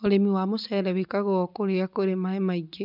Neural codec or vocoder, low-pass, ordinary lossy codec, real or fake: none; 5.4 kHz; none; real